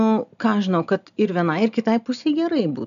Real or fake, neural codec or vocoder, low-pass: real; none; 7.2 kHz